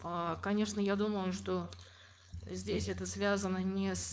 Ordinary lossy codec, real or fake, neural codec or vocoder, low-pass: none; fake; codec, 16 kHz, 4.8 kbps, FACodec; none